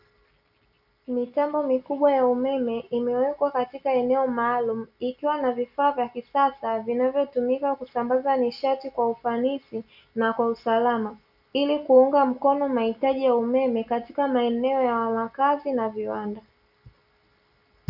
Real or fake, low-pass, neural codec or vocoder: real; 5.4 kHz; none